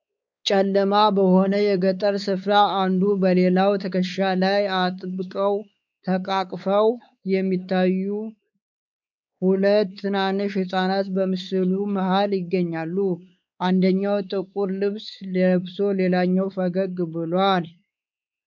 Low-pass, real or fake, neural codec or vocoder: 7.2 kHz; fake; codec, 16 kHz, 4 kbps, X-Codec, WavLM features, trained on Multilingual LibriSpeech